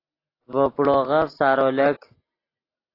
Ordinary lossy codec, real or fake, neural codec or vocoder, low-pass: AAC, 24 kbps; real; none; 5.4 kHz